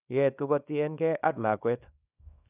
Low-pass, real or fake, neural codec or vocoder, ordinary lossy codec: 3.6 kHz; fake; codec, 24 kHz, 0.9 kbps, WavTokenizer, small release; none